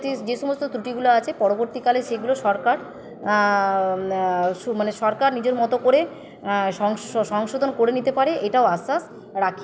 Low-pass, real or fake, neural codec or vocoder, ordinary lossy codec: none; real; none; none